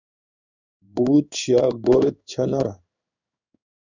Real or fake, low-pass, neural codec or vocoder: fake; 7.2 kHz; codec, 16 kHz in and 24 kHz out, 1 kbps, XY-Tokenizer